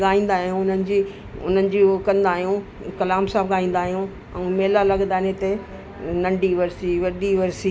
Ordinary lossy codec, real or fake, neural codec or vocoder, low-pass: none; real; none; none